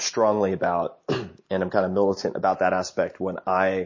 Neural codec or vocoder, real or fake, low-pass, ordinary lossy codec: none; real; 7.2 kHz; MP3, 32 kbps